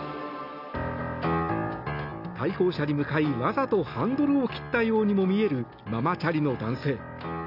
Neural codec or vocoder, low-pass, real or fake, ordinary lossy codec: none; 5.4 kHz; real; none